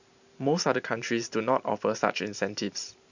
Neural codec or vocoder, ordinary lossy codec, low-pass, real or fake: vocoder, 44.1 kHz, 128 mel bands every 512 samples, BigVGAN v2; none; 7.2 kHz; fake